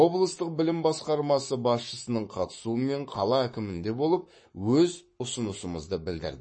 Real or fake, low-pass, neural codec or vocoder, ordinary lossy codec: fake; 9.9 kHz; codec, 44.1 kHz, 7.8 kbps, Pupu-Codec; MP3, 32 kbps